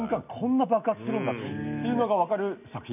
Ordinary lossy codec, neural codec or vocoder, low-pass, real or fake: none; none; 3.6 kHz; real